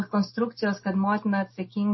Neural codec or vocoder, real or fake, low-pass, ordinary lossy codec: none; real; 7.2 kHz; MP3, 24 kbps